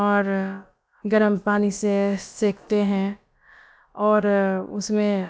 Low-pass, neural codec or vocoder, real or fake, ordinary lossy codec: none; codec, 16 kHz, about 1 kbps, DyCAST, with the encoder's durations; fake; none